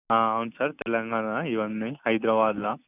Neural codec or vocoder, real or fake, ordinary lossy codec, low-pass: none; real; none; 3.6 kHz